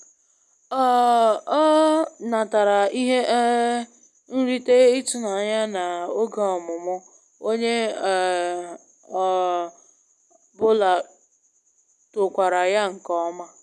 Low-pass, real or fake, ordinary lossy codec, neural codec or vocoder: none; real; none; none